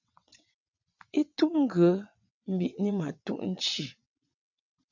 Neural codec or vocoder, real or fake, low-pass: vocoder, 22.05 kHz, 80 mel bands, WaveNeXt; fake; 7.2 kHz